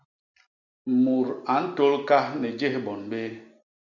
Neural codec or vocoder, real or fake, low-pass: none; real; 7.2 kHz